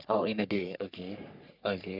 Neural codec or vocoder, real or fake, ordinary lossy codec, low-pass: codec, 44.1 kHz, 3.4 kbps, Pupu-Codec; fake; none; 5.4 kHz